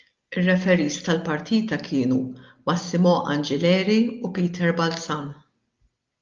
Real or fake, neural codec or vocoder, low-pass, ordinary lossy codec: real; none; 7.2 kHz; Opus, 32 kbps